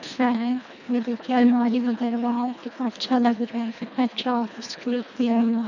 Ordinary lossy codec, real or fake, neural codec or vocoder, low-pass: none; fake; codec, 24 kHz, 1.5 kbps, HILCodec; 7.2 kHz